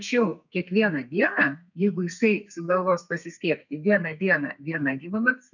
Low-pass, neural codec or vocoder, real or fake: 7.2 kHz; codec, 32 kHz, 1.9 kbps, SNAC; fake